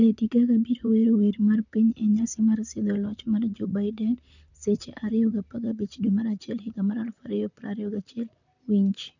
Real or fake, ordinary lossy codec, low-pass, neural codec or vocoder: fake; none; 7.2 kHz; vocoder, 44.1 kHz, 128 mel bands, Pupu-Vocoder